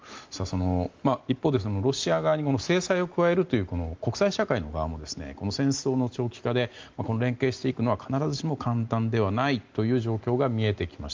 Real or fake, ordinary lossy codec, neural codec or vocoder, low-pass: real; Opus, 32 kbps; none; 7.2 kHz